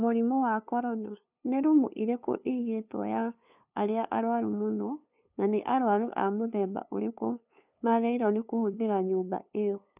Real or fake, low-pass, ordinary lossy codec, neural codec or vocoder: fake; 3.6 kHz; none; codec, 16 kHz, 2 kbps, FunCodec, trained on LibriTTS, 25 frames a second